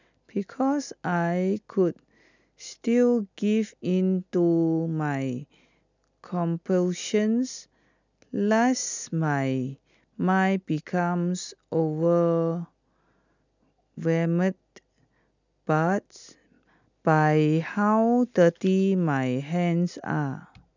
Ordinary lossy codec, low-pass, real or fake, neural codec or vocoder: none; 7.2 kHz; real; none